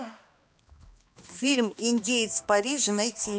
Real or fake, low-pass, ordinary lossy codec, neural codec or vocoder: fake; none; none; codec, 16 kHz, 2 kbps, X-Codec, HuBERT features, trained on balanced general audio